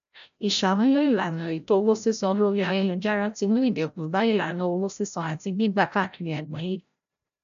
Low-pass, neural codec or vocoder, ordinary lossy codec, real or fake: 7.2 kHz; codec, 16 kHz, 0.5 kbps, FreqCodec, larger model; AAC, 96 kbps; fake